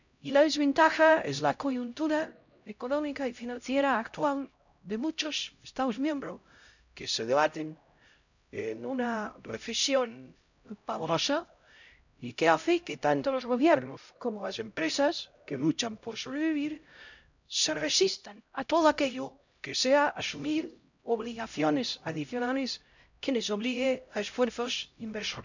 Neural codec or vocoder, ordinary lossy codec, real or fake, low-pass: codec, 16 kHz, 0.5 kbps, X-Codec, HuBERT features, trained on LibriSpeech; none; fake; 7.2 kHz